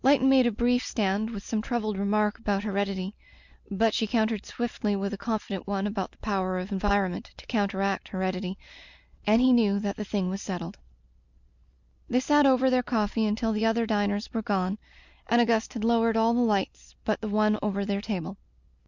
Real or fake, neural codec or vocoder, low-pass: real; none; 7.2 kHz